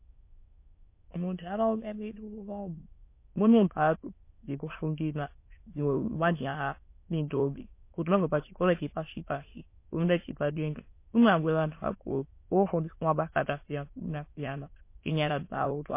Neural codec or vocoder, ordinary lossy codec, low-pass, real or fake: autoencoder, 22.05 kHz, a latent of 192 numbers a frame, VITS, trained on many speakers; MP3, 24 kbps; 3.6 kHz; fake